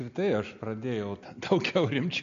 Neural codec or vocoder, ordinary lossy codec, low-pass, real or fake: none; MP3, 64 kbps; 7.2 kHz; real